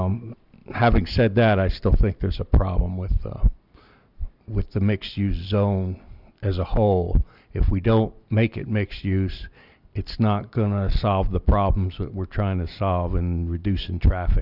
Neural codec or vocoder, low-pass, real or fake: none; 5.4 kHz; real